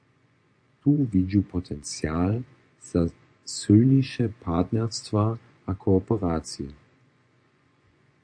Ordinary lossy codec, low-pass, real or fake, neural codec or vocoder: AAC, 64 kbps; 9.9 kHz; fake; vocoder, 24 kHz, 100 mel bands, Vocos